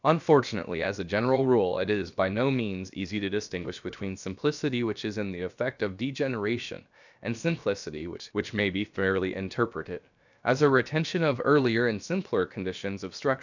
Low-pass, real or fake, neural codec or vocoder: 7.2 kHz; fake; codec, 16 kHz, about 1 kbps, DyCAST, with the encoder's durations